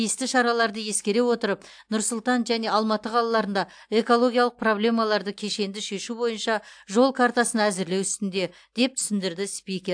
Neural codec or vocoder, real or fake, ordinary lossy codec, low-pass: none; real; AAC, 64 kbps; 9.9 kHz